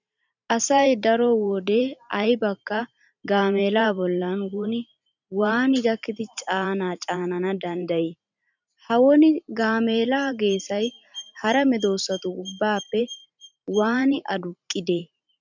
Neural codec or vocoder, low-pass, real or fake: vocoder, 44.1 kHz, 128 mel bands every 512 samples, BigVGAN v2; 7.2 kHz; fake